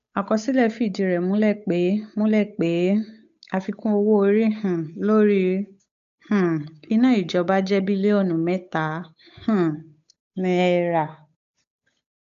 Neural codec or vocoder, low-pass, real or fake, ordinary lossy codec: codec, 16 kHz, 8 kbps, FunCodec, trained on Chinese and English, 25 frames a second; 7.2 kHz; fake; MP3, 64 kbps